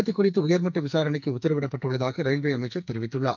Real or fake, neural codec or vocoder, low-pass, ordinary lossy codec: fake; codec, 44.1 kHz, 2.6 kbps, SNAC; 7.2 kHz; none